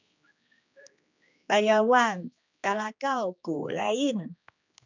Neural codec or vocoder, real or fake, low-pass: codec, 16 kHz, 2 kbps, X-Codec, HuBERT features, trained on general audio; fake; 7.2 kHz